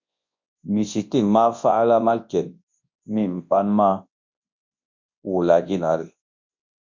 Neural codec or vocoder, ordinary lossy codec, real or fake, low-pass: codec, 24 kHz, 0.9 kbps, WavTokenizer, large speech release; MP3, 48 kbps; fake; 7.2 kHz